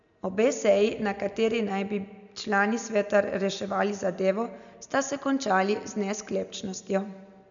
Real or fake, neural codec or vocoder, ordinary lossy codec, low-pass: real; none; MP3, 96 kbps; 7.2 kHz